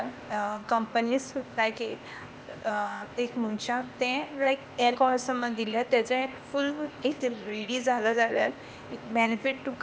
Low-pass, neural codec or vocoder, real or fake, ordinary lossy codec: none; codec, 16 kHz, 0.8 kbps, ZipCodec; fake; none